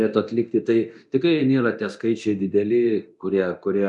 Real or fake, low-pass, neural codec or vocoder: fake; 10.8 kHz; codec, 24 kHz, 0.9 kbps, DualCodec